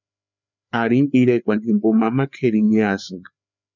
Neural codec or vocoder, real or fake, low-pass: codec, 16 kHz, 4 kbps, FreqCodec, larger model; fake; 7.2 kHz